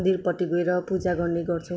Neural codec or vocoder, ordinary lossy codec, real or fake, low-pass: none; none; real; none